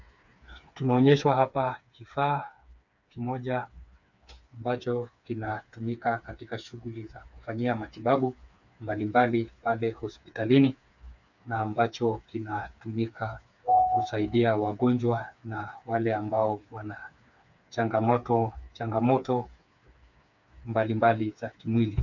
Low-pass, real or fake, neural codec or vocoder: 7.2 kHz; fake; codec, 16 kHz, 4 kbps, FreqCodec, smaller model